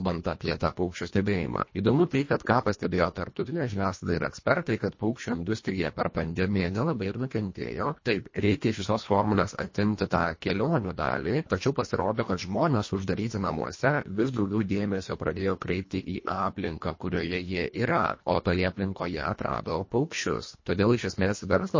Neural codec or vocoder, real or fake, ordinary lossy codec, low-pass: codec, 24 kHz, 1.5 kbps, HILCodec; fake; MP3, 32 kbps; 7.2 kHz